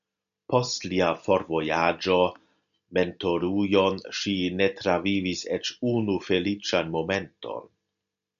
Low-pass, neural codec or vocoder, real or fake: 7.2 kHz; none; real